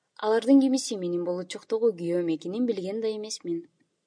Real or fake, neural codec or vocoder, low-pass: real; none; 9.9 kHz